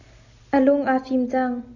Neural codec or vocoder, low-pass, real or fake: none; 7.2 kHz; real